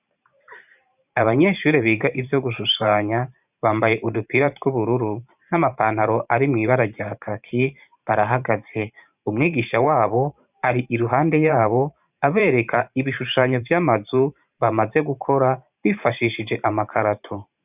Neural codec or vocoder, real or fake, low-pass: vocoder, 44.1 kHz, 128 mel bands every 512 samples, BigVGAN v2; fake; 3.6 kHz